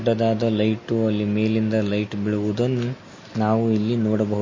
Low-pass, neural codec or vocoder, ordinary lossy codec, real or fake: 7.2 kHz; none; MP3, 32 kbps; real